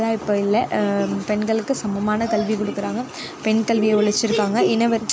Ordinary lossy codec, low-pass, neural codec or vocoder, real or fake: none; none; none; real